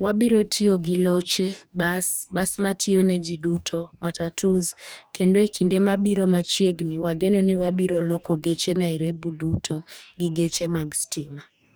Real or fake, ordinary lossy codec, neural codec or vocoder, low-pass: fake; none; codec, 44.1 kHz, 2.6 kbps, DAC; none